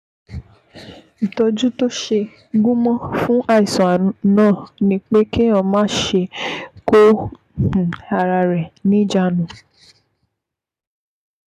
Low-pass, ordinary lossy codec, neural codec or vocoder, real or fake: 14.4 kHz; none; none; real